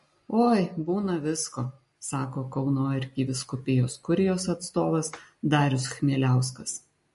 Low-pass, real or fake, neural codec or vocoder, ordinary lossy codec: 14.4 kHz; real; none; MP3, 48 kbps